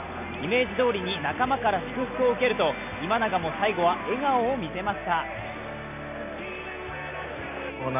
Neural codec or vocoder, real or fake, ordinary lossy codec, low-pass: none; real; none; 3.6 kHz